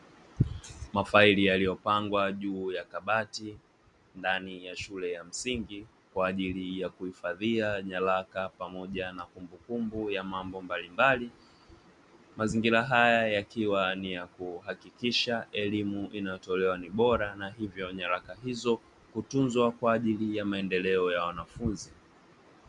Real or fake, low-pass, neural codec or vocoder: real; 10.8 kHz; none